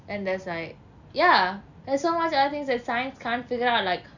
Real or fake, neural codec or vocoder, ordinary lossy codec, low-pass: real; none; none; 7.2 kHz